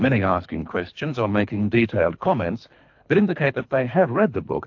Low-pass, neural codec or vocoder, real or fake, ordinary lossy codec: 7.2 kHz; codec, 24 kHz, 3 kbps, HILCodec; fake; AAC, 48 kbps